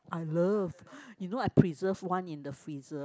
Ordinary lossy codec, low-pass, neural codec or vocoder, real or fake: none; none; none; real